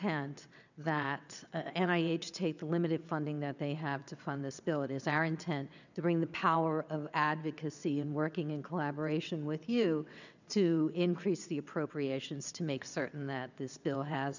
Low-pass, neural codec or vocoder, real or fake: 7.2 kHz; vocoder, 22.05 kHz, 80 mel bands, WaveNeXt; fake